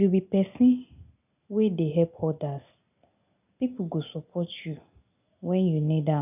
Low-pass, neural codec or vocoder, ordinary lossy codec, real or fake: 3.6 kHz; none; none; real